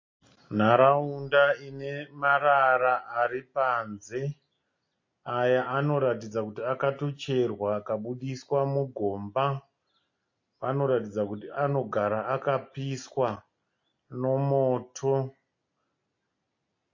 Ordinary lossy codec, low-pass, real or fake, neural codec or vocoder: MP3, 32 kbps; 7.2 kHz; real; none